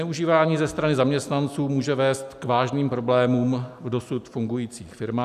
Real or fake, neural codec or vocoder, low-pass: real; none; 14.4 kHz